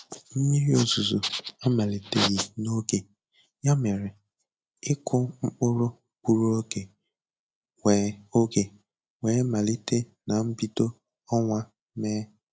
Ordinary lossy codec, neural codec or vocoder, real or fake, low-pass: none; none; real; none